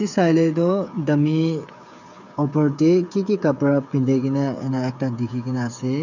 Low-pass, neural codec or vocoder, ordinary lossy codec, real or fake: 7.2 kHz; codec, 16 kHz, 8 kbps, FreqCodec, smaller model; none; fake